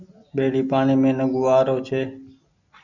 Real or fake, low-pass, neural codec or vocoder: real; 7.2 kHz; none